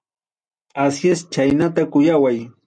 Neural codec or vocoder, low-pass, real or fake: none; 9.9 kHz; real